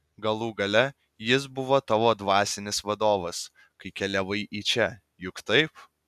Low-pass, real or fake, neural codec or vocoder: 14.4 kHz; real; none